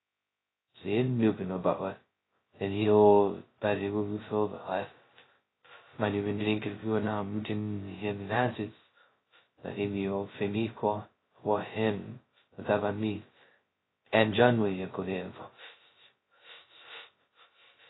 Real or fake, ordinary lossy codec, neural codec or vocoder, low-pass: fake; AAC, 16 kbps; codec, 16 kHz, 0.2 kbps, FocalCodec; 7.2 kHz